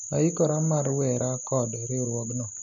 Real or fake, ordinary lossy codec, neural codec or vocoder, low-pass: real; none; none; 7.2 kHz